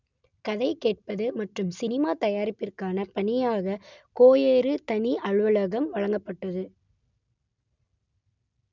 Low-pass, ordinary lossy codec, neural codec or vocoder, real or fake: 7.2 kHz; none; none; real